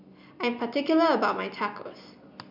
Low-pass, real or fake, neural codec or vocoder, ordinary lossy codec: 5.4 kHz; real; none; MP3, 48 kbps